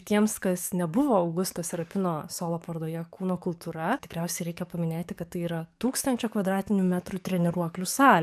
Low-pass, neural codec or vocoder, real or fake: 14.4 kHz; codec, 44.1 kHz, 7.8 kbps, DAC; fake